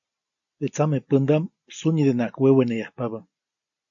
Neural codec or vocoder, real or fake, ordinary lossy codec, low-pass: none; real; AAC, 64 kbps; 7.2 kHz